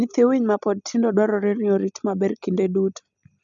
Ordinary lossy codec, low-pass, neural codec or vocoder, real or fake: none; 7.2 kHz; none; real